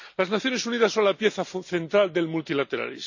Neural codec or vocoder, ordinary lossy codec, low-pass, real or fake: none; none; 7.2 kHz; real